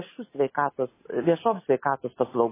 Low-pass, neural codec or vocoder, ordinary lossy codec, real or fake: 3.6 kHz; none; MP3, 16 kbps; real